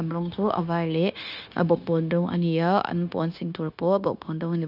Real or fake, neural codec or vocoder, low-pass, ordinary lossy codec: fake; codec, 16 kHz, 0.9 kbps, LongCat-Audio-Codec; 5.4 kHz; none